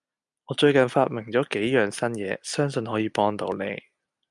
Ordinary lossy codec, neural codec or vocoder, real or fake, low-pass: MP3, 96 kbps; none; real; 10.8 kHz